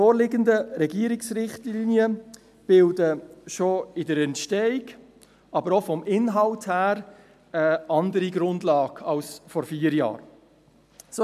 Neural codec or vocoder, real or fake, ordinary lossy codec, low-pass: none; real; MP3, 96 kbps; 14.4 kHz